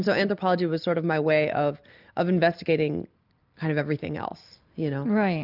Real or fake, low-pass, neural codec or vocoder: real; 5.4 kHz; none